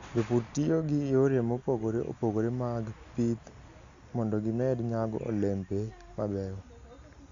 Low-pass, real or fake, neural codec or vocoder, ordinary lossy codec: 7.2 kHz; real; none; Opus, 64 kbps